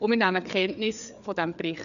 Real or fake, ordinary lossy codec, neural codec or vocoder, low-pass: fake; none; codec, 16 kHz, 4 kbps, FreqCodec, larger model; 7.2 kHz